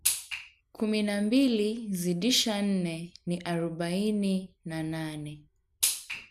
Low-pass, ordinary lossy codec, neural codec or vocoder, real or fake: 14.4 kHz; none; none; real